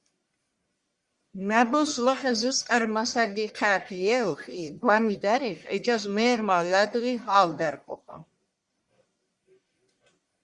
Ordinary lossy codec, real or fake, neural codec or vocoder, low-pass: Opus, 64 kbps; fake; codec, 44.1 kHz, 1.7 kbps, Pupu-Codec; 10.8 kHz